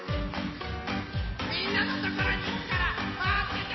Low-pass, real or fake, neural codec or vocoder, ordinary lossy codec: 7.2 kHz; real; none; MP3, 24 kbps